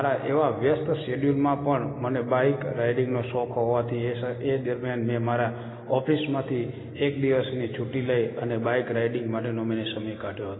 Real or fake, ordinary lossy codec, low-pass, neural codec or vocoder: real; AAC, 16 kbps; 7.2 kHz; none